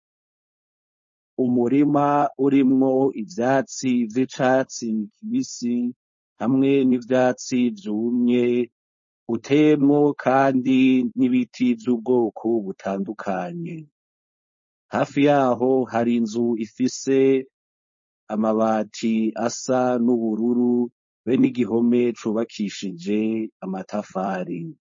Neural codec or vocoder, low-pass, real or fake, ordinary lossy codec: codec, 16 kHz, 4.8 kbps, FACodec; 7.2 kHz; fake; MP3, 32 kbps